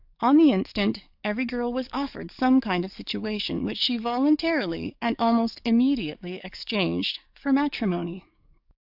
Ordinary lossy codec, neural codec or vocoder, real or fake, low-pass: AAC, 48 kbps; codec, 44.1 kHz, 7.8 kbps, DAC; fake; 5.4 kHz